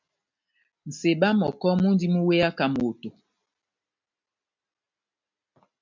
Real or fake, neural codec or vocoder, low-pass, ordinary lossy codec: real; none; 7.2 kHz; MP3, 64 kbps